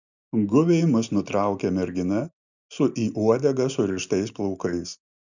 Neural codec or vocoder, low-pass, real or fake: none; 7.2 kHz; real